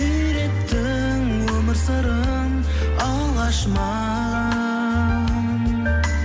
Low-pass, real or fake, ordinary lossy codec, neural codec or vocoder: none; real; none; none